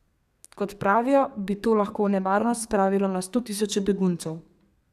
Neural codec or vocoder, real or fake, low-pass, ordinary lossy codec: codec, 32 kHz, 1.9 kbps, SNAC; fake; 14.4 kHz; none